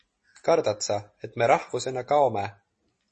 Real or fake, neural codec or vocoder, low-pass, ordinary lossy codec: real; none; 10.8 kHz; MP3, 32 kbps